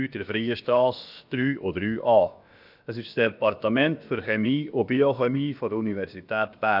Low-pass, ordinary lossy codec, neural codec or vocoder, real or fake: 5.4 kHz; none; codec, 16 kHz, about 1 kbps, DyCAST, with the encoder's durations; fake